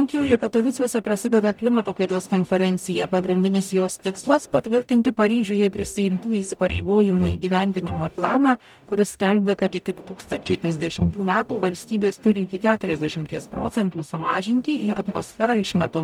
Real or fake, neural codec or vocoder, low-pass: fake; codec, 44.1 kHz, 0.9 kbps, DAC; 19.8 kHz